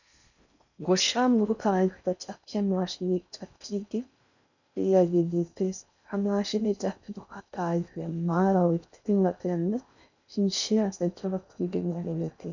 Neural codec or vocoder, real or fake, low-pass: codec, 16 kHz in and 24 kHz out, 0.6 kbps, FocalCodec, streaming, 4096 codes; fake; 7.2 kHz